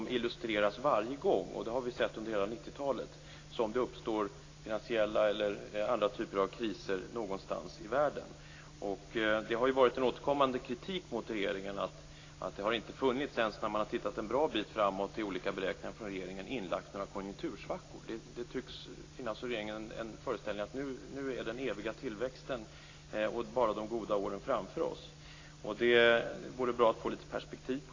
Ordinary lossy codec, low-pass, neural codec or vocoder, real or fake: AAC, 32 kbps; 7.2 kHz; none; real